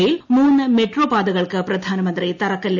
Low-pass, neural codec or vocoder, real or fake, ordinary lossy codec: 7.2 kHz; none; real; none